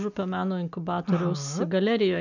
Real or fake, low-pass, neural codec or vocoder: real; 7.2 kHz; none